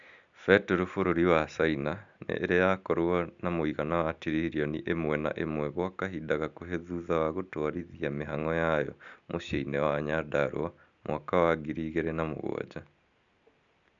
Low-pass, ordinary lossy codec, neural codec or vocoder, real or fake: 7.2 kHz; none; none; real